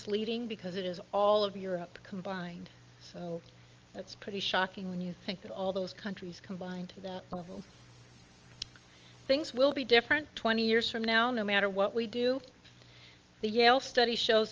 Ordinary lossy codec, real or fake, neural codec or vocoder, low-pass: Opus, 24 kbps; real; none; 7.2 kHz